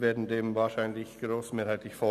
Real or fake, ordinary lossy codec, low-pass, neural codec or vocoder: real; none; 14.4 kHz; none